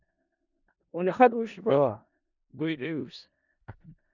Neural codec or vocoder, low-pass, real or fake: codec, 16 kHz in and 24 kHz out, 0.4 kbps, LongCat-Audio-Codec, four codebook decoder; 7.2 kHz; fake